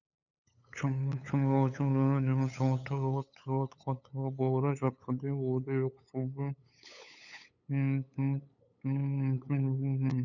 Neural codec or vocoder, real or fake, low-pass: codec, 16 kHz, 8 kbps, FunCodec, trained on LibriTTS, 25 frames a second; fake; 7.2 kHz